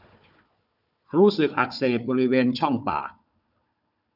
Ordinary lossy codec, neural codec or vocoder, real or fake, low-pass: none; codec, 16 kHz, 4 kbps, FunCodec, trained on Chinese and English, 50 frames a second; fake; 5.4 kHz